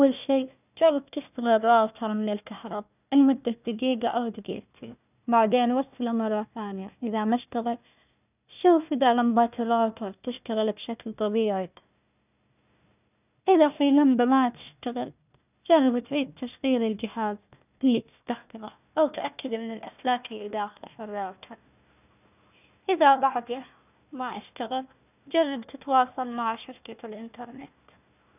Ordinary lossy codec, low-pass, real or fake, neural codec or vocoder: none; 3.6 kHz; fake; codec, 16 kHz, 1 kbps, FunCodec, trained on Chinese and English, 50 frames a second